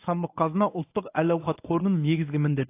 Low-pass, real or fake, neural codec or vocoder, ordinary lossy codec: 3.6 kHz; fake; codec, 24 kHz, 0.9 kbps, WavTokenizer, medium speech release version 1; AAC, 24 kbps